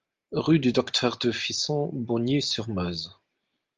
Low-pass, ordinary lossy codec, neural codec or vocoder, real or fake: 7.2 kHz; Opus, 32 kbps; none; real